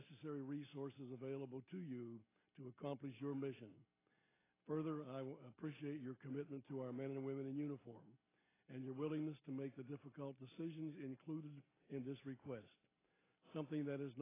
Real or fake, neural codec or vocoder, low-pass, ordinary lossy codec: real; none; 3.6 kHz; AAC, 16 kbps